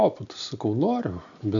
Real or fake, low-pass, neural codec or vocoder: real; 7.2 kHz; none